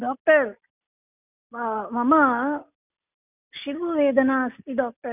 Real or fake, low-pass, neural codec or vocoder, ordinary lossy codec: real; 3.6 kHz; none; none